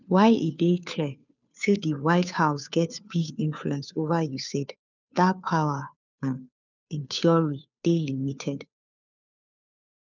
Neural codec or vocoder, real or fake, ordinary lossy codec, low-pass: codec, 16 kHz, 2 kbps, FunCodec, trained on Chinese and English, 25 frames a second; fake; none; 7.2 kHz